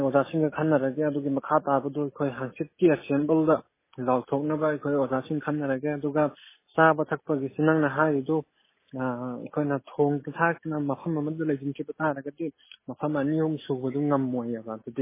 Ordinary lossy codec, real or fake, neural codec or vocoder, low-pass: MP3, 16 kbps; real; none; 3.6 kHz